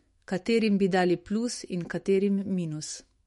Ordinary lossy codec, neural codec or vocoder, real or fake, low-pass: MP3, 48 kbps; autoencoder, 48 kHz, 128 numbers a frame, DAC-VAE, trained on Japanese speech; fake; 19.8 kHz